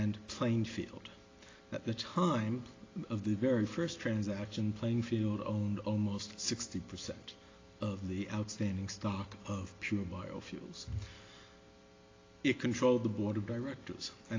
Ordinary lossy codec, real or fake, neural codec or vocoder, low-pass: AAC, 32 kbps; real; none; 7.2 kHz